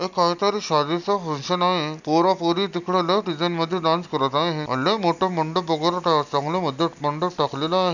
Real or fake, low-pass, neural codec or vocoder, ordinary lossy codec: real; 7.2 kHz; none; none